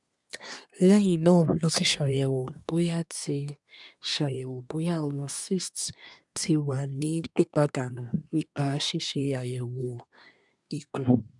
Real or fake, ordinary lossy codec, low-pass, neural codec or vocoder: fake; none; 10.8 kHz; codec, 24 kHz, 1 kbps, SNAC